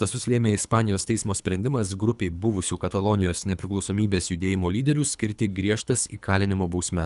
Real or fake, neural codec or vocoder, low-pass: fake; codec, 24 kHz, 3 kbps, HILCodec; 10.8 kHz